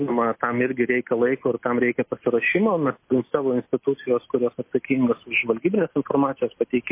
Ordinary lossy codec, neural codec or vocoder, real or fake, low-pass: MP3, 24 kbps; none; real; 3.6 kHz